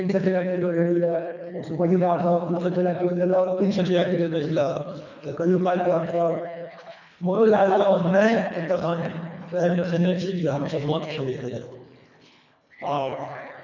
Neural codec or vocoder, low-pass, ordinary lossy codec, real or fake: codec, 24 kHz, 1.5 kbps, HILCodec; 7.2 kHz; none; fake